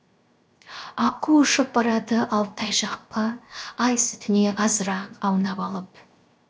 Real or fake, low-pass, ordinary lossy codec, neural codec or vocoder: fake; none; none; codec, 16 kHz, 0.7 kbps, FocalCodec